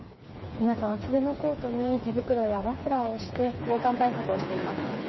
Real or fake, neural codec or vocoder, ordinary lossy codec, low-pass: fake; codec, 16 kHz, 4 kbps, FreqCodec, smaller model; MP3, 24 kbps; 7.2 kHz